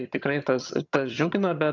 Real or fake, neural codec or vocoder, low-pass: fake; vocoder, 22.05 kHz, 80 mel bands, HiFi-GAN; 7.2 kHz